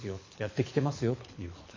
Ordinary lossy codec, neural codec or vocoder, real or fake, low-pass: MP3, 32 kbps; codec, 24 kHz, 6 kbps, HILCodec; fake; 7.2 kHz